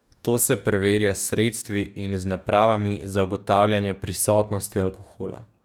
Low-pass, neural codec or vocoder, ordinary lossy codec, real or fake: none; codec, 44.1 kHz, 2.6 kbps, DAC; none; fake